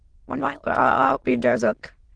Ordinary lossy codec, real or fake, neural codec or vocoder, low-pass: Opus, 16 kbps; fake; autoencoder, 22.05 kHz, a latent of 192 numbers a frame, VITS, trained on many speakers; 9.9 kHz